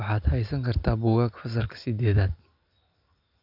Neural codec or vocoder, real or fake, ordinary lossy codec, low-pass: none; real; none; 5.4 kHz